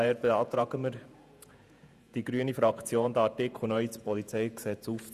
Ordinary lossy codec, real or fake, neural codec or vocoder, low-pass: Opus, 64 kbps; fake; vocoder, 48 kHz, 128 mel bands, Vocos; 14.4 kHz